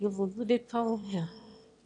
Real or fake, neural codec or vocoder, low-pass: fake; autoencoder, 22.05 kHz, a latent of 192 numbers a frame, VITS, trained on one speaker; 9.9 kHz